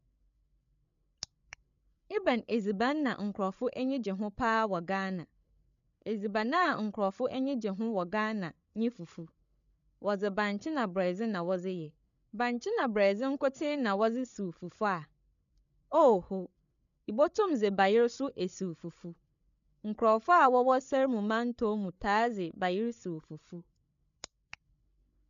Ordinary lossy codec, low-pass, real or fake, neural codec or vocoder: MP3, 96 kbps; 7.2 kHz; fake; codec, 16 kHz, 8 kbps, FreqCodec, larger model